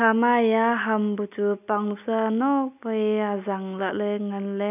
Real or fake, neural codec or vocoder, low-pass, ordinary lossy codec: real; none; 3.6 kHz; none